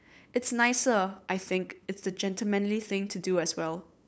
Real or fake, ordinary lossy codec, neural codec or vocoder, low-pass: fake; none; codec, 16 kHz, 8 kbps, FunCodec, trained on LibriTTS, 25 frames a second; none